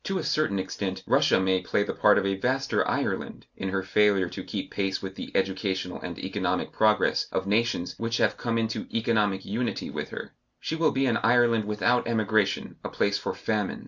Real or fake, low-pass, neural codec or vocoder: real; 7.2 kHz; none